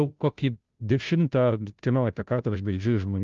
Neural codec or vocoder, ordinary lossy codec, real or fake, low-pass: codec, 16 kHz, 0.5 kbps, FunCodec, trained on Chinese and English, 25 frames a second; Opus, 32 kbps; fake; 7.2 kHz